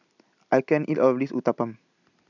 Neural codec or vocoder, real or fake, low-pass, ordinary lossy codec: none; real; 7.2 kHz; none